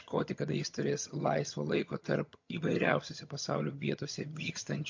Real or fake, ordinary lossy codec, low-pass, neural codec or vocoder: fake; MP3, 48 kbps; 7.2 kHz; vocoder, 22.05 kHz, 80 mel bands, HiFi-GAN